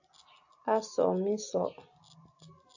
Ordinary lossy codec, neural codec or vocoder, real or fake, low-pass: AAC, 48 kbps; none; real; 7.2 kHz